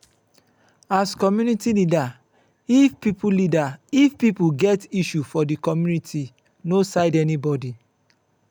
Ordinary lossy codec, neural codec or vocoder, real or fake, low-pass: none; none; real; none